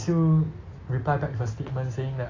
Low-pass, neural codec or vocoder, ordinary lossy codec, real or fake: 7.2 kHz; none; AAC, 32 kbps; real